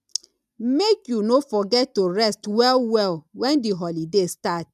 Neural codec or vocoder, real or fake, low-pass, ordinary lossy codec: none; real; 14.4 kHz; none